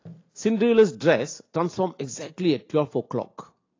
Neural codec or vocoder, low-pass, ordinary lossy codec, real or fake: none; 7.2 kHz; AAC, 32 kbps; real